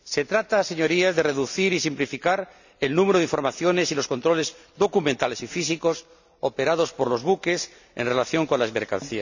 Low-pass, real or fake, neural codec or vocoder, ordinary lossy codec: 7.2 kHz; real; none; none